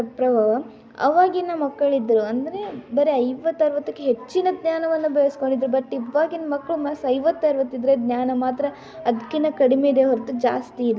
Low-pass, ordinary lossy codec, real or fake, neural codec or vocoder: none; none; real; none